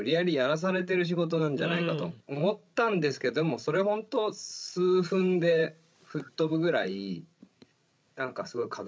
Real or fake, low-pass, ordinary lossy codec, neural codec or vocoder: fake; none; none; codec, 16 kHz, 16 kbps, FreqCodec, larger model